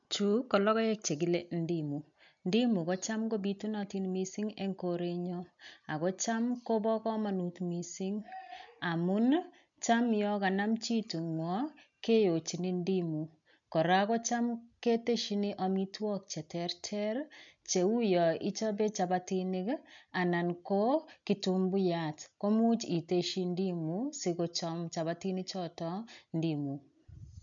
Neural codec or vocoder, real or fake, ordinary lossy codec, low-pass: none; real; MP3, 64 kbps; 7.2 kHz